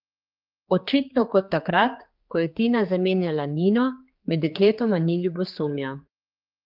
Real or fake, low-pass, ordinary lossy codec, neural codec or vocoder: fake; 5.4 kHz; Opus, 32 kbps; codec, 16 kHz, 4 kbps, X-Codec, HuBERT features, trained on general audio